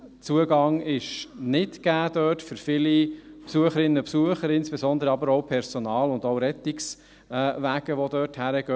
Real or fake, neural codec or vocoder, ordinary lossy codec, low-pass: real; none; none; none